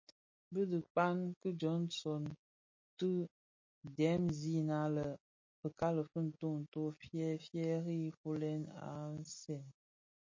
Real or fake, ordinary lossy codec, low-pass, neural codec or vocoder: real; MP3, 32 kbps; 7.2 kHz; none